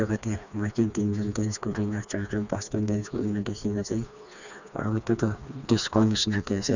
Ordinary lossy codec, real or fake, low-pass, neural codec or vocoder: none; fake; 7.2 kHz; codec, 16 kHz, 2 kbps, FreqCodec, smaller model